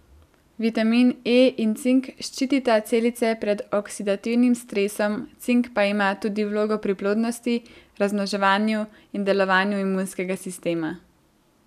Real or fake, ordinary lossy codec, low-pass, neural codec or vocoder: real; none; 14.4 kHz; none